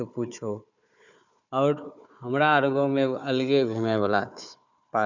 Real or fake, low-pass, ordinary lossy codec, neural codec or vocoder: fake; 7.2 kHz; none; codec, 16 kHz, 4 kbps, FunCodec, trained on Chinese and English, 50 frames a second